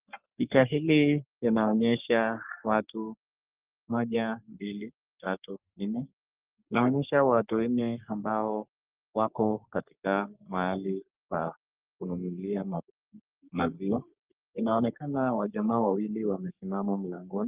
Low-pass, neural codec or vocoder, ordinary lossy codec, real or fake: 3.6 kHz; codec, 44.1 kHz, 3.4 kbps, Pupu-Codec; Opus, 32 kbps; fake